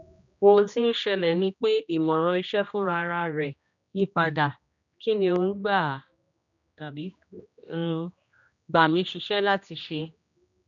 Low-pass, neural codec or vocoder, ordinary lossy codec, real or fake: 7.2 kHz; codec, 16 kHz, 1 kbps, X-Codec, HuBERT features, trained on general audio; none; fake